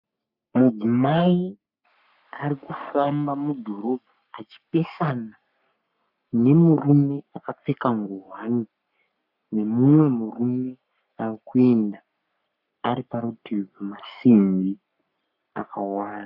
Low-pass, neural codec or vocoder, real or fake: 5.4 kHz; codec, 44.1 kHz, 3.4 kbps, Pupu-Codec; fake